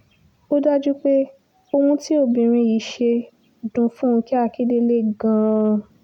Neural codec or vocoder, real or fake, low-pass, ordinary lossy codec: none; real; 19.8 kHz; none